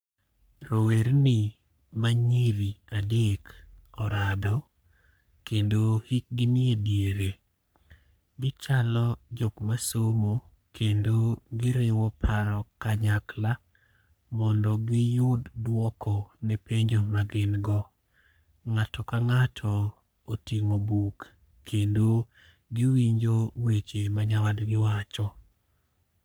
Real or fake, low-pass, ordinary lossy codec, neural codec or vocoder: fake; none; none; codec, 44.1 kHz, 3.4 kbps, Pupu-Codec